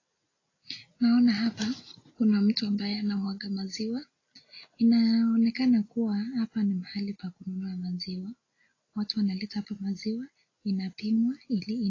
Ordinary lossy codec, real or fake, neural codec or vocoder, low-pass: AAC, 32 kbps; real; none; 7.2 kHz